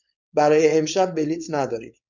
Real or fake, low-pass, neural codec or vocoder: fake; 7.2 kHz; codec, 16 kHz, 4.8 kbps, FACodec